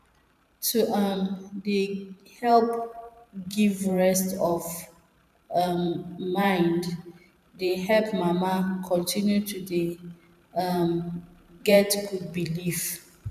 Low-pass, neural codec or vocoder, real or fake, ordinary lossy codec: 14.4 kHz; vocoder, 44.1 kHz, 128 mel bands every 512 samples, BigVGAN v2; fake; none